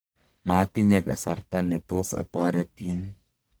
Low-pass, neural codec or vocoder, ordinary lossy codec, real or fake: none; codec, 44.1 kHz, 1.7 kbps, Pupu-Codec; none; fake